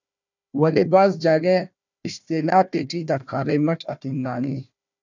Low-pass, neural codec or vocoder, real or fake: 7.2 kHz; codec, 16 kHz, 1 kbps, FunCodec, trained on Chinese and English, 50 frames a second; fake